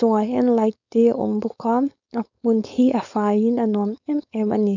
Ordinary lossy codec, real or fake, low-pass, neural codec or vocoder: none; fake; 7.2 kHz; codec, 16 kHz, 4.8 kbps, FACodec